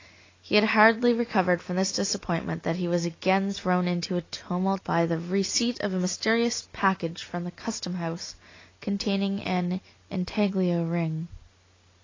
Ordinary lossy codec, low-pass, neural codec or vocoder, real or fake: AAC, 32 kbps; 7.2 kHz; none; real